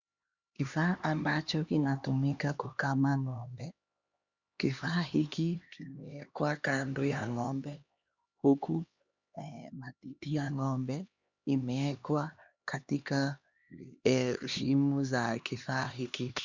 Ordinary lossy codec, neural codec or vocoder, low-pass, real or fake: Opus, 64 kbps; codec, 16 kHz, 2 kbps, X-Codec, HuBERT features, trained on LibriSpeech; 7.2 kHz; fake